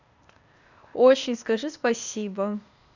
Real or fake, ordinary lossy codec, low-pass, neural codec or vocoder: fake; none; 7.2 kHz; codec, 16 kHz, 0.8 kbps, ZipCodec